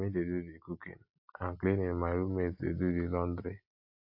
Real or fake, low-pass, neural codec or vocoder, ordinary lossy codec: real; 5.4 kHz; none; AAC, 48 kbps